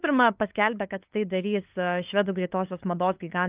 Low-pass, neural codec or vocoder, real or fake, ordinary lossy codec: 3.6 kHz; codec, 16 kHz, 8 kbps, FunCodec, trained on LibriTTS, 25 frames a second; fake; Opus, 24 kbps